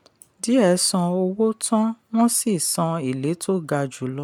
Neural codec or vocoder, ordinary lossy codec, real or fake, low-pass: none; none; real; 19.8 kHz